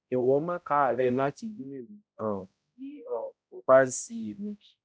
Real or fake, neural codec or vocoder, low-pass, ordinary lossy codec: fake; codec, 16 kHz, 0.5 kbps, X-Codec, HuBERT features, trained on balanced general audio; none; none